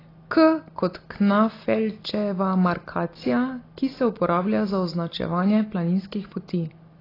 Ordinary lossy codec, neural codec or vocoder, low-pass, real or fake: AAC, 24 kbps; none; 5.4 kHz; real